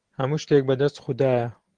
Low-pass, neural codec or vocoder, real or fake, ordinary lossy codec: 9.9 kHz; none; real; Opus, 16 kbps